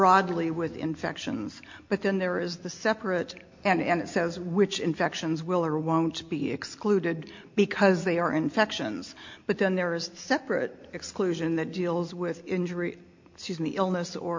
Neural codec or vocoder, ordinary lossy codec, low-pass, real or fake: none; MP3, 48 kbps; 7.2 kHz; real